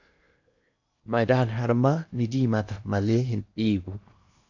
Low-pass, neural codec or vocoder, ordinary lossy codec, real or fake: 7.2 kHz; codec, 16 kHz in and 24 kHz out, 0.8 kbps, FocalCodec, streaming, 65536 codes; AAC, 48 kbps; fake